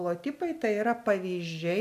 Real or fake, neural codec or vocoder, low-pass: real; none; 14.4 kHz